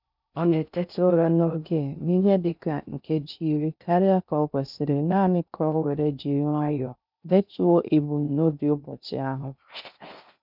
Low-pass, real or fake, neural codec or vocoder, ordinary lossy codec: 5.4 kHz; fake; codec, 16 kHz in and 24 kHz out, 0.6 kbps, FocalCodec, streaming, 4096 codes; none